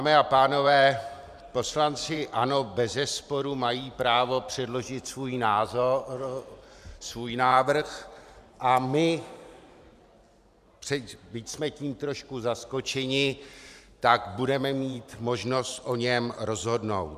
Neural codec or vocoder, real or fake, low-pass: vocoder, 44.1 kHz, 128 mel bands every 512 samples, BigVGAN v2; fake; 14.4 kHz